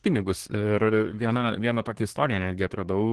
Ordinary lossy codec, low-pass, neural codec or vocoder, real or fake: Opus, 16 kbps; 10.8 kHz; codec, 24 kHz, 1 kbps, SNAC; fake